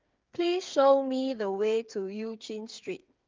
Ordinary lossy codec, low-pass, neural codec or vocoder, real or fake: Opus, 24 kbps; 7.2 kHz; codec, 16 kHz, 8 kbps, FreqCodec, smaller model; fake